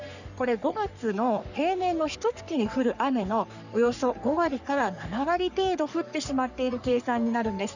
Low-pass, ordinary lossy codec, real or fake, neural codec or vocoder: 7.2 kHz; none; fake; codec, 44.1 kHz, 3.4 kbps, Pupu-Codec